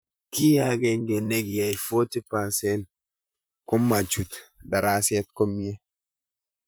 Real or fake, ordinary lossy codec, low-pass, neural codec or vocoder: fake; none; none; vocoder, 44.1 kHz, 128 mel bands, Pupu-Vocoder